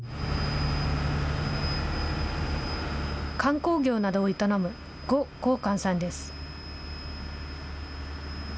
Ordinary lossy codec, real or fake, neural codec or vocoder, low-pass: none; real; none; none